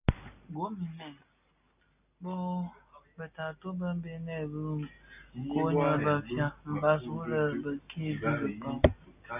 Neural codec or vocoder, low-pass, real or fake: none; 3.6 kHz; real